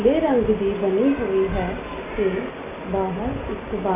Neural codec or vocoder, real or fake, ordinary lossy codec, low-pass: none; real; AAC, 24 kbps; 3.6 kHz